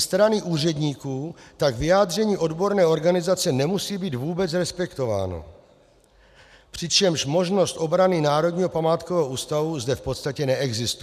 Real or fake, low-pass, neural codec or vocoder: real; 14.4 kHz; none